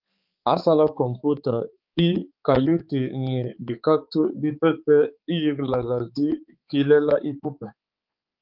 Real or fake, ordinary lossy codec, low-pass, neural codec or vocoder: fake; Opus, 24 kbps; 5.4 kHz; codec, 16 kHz, 4 kbps, X-Codec, HuBERT features, trained on balanced general audio